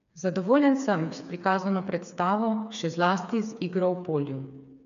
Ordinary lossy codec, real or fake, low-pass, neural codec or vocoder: none; fake; 7.2 kHz; codec, 16 kHz, 4 kbps, FreqCodec, smaller model